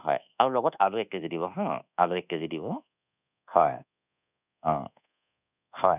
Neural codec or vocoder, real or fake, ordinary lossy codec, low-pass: codec, 24 kHz, 1.2 kbps, DualCodec; fake; none; 3.6 kHz